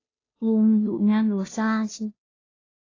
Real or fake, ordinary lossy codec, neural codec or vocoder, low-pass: fake; AAC, 32 kbps; codec, 16 kHz, 0.5 kbps, FunCodec, trained on Chinese and English, 25 frames a second; 7.2 kHz